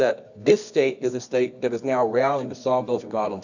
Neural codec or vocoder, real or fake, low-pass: codec, 24 kHz, 0.9 kbps, WavTokenizer, medium music audio release; fake; 7.2 kHz